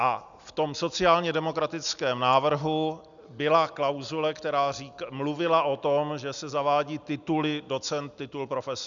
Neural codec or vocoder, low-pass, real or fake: none; 7.2 kHz; real